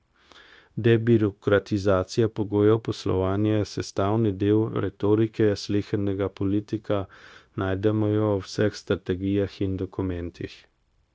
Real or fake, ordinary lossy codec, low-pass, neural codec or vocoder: fake; none; none; codec, 16 kHz, 0.9 kbps, LongCat-Audio-Codec